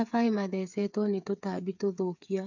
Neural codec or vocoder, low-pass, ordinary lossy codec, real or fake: codec, 16 kHz, 8 kbps, FreqCodec, smaller model; 7.2 kHz; none; fake